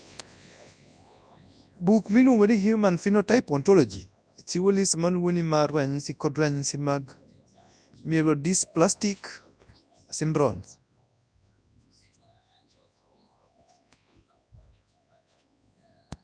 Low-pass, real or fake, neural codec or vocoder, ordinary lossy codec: 9.9 kHz; fake; codec, 24 kHz, 0.9 kbps, WavTokenizer, large speech release; none